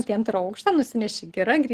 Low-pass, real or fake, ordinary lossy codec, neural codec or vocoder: 14.4 kHz; real; Opus, 16 kbps; none